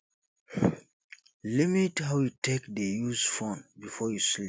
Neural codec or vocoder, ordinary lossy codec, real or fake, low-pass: none; none; real; none